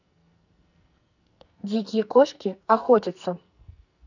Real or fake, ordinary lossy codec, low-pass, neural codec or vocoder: fake; none; 7.2 kHz; codec, 44.1 kHz, 2.6 kbps, SNAC